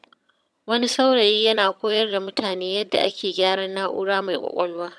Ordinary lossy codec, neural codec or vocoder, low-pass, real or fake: none; vocoder, 22.05 kHz, 80 mel bands, HiFi-GAN; none; fake